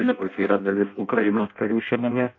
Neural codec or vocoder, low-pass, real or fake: codec, 16 kHz in and 24 kHz out, 0.6 kbps, FireRedTTS-2 codec; 7.2 kHz; fake